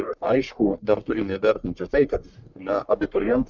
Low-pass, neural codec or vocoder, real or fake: 7.2 kHz; codec, 44.1 kHz, 1.7 kbps, Pupu-Codec; fake